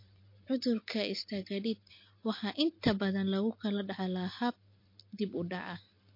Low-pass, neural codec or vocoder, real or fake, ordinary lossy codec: 5.4 kHz; none; real; MP3, 32 kbps